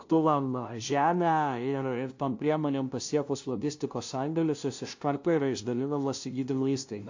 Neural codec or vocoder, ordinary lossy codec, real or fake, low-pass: codec, 16 kHz, 0.5 kbps, FunCodec, trained on LibriTTS, 25 frames a second; AAC, 48 kbps; fake; 7.2 kHz